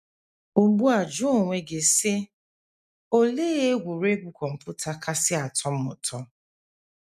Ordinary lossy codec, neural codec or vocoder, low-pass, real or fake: none; none; 14.4 kHz; real